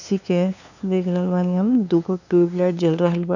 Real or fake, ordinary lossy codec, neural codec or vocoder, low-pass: fake; none; codec, 16 kHz, 2 kbps, FunCodec, trained on LibriTTS, 25 frames a second; 7.2 kHz